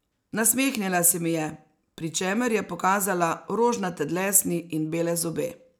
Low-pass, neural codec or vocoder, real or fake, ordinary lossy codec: none; none; real; none